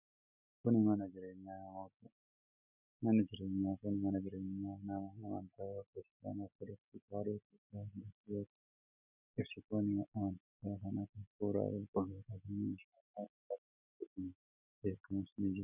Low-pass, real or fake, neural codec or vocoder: 3.6 kHz; real; none